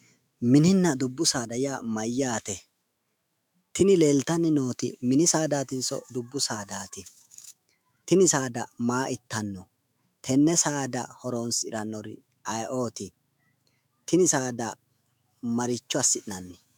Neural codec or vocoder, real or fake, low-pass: autoencoder, 48 kHz, 128 numbers a frame, DAC-VAE, trained on Japanese speech; fake; 19.8 kHz